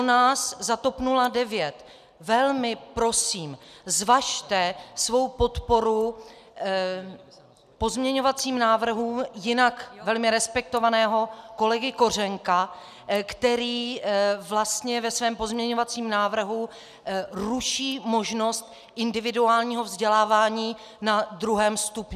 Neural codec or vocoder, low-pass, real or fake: none; 14.4 kHz; real